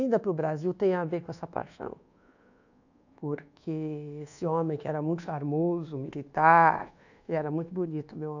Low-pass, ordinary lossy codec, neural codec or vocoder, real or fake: 7.2 kHz; none; codec, 24 kHz, 1.2 kbps, DualCodec; fake